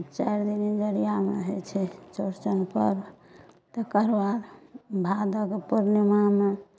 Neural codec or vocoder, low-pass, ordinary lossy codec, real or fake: none; none; none; real